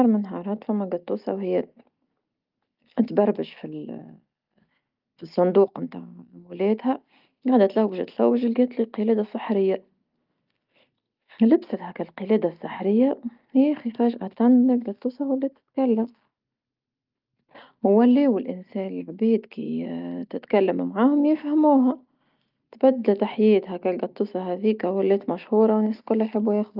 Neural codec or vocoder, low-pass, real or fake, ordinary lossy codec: none; 5.4 kHz; real; Opus, 32 kbps